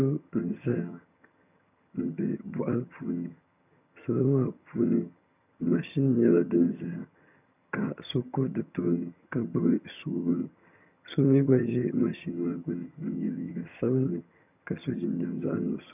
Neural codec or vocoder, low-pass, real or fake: vocoder, 22.05 kHz, 80 mel bands, HiFi-GAN; 3.6 kHz; fake